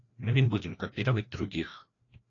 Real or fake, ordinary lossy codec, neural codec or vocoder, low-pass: fake; AAC, 32 kbps; codec, 16 kHz, 1 kbps, FreqCodec, larger model; 7.2 kHz